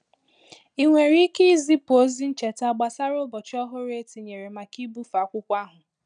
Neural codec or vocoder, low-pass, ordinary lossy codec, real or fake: none; 9.9 kHz; none; real